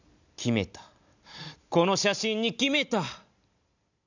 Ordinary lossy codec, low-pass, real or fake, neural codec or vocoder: none; 7.2 kHz; real; none